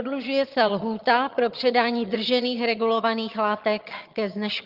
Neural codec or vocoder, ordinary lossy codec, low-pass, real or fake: vocoder, 22.05 kHz, 80 mel bands, HiFi-GAN; Opus, 32 kbps; 5.4 kHz; fake